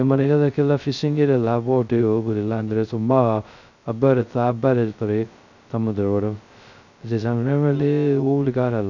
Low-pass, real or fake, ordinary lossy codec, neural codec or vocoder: 7.2 kHz; fake; none; codec, 16 kHz, 0.2 kbps, FocalCodec